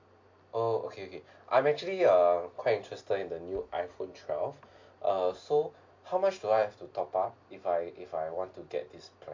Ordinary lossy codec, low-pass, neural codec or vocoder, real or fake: MP3, 48 kbps; 7.2 kHz; none; real